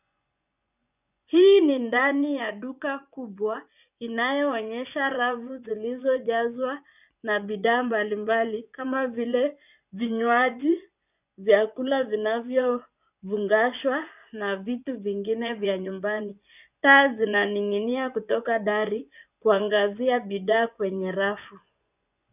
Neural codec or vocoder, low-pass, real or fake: vocoder, 24 kHz, 100 mel bands, Vocos; 3.6 kHz; fake